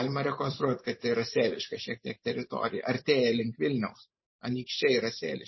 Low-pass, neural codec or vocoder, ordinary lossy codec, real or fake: 7.2 kHz; vocoder, 44.1 kHz, 128 mel bands every 512 samples, BigVGAN v2; MP3, 24 kbps; fake